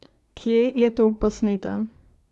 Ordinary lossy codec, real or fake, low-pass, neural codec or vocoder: none; fake; none; codec, 24 kHz, 1 kbps, SNAC